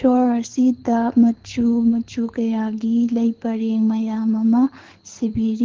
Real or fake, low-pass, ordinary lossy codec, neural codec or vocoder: fake; 7.2 kHz; Opus, 16 kbps; codec, 24 kHz, 6 kbps, HILCodec